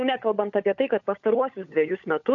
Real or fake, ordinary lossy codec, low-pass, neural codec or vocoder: fake; AAC, 48 kbps; 7.2 kHz; codec, 16 kHz, 16 kbps, FunCodec, trained on LibriTTS, 50 frames a second